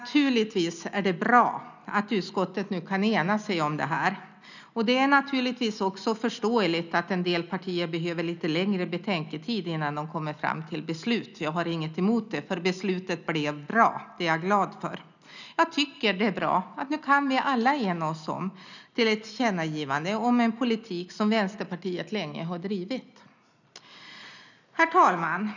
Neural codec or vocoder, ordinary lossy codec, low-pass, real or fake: none; none; 7.2 kHz; real